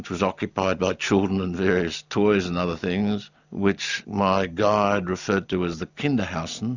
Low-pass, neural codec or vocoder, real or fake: 7.2 kHz; none; real